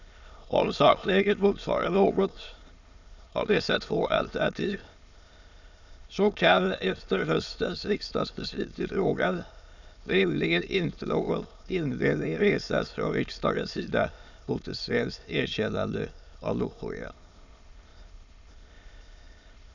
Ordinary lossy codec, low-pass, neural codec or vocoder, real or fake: none; 7.2 kHz; autoencoder, 22.05 kHz, a latent of 192 numbers a frame, VITS, trained on many speakers; fake